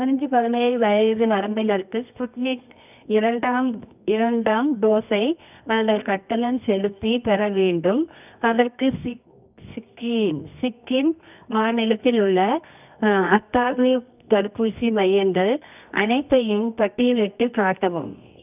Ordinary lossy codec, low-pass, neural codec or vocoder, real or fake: none; 3.6 kHz; codec, 24 kHz, 0.9 kbps, WavTokenizer, medium music audio release; fake